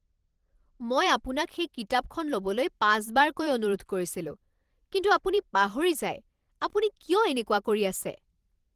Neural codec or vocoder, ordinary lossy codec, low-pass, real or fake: vocoder, 44.1 kHz, 128 mel bands every 512 samples, BigVGAN v2; Opus, 16 kbps; 14.4 kHz; fake